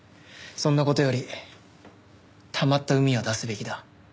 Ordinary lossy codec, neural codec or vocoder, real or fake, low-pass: none; none; real; none